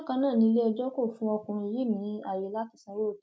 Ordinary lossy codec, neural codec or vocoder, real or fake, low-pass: none; none; real; none